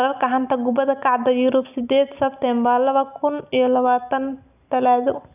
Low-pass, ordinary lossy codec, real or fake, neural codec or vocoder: 3.6 kHz; none; real; none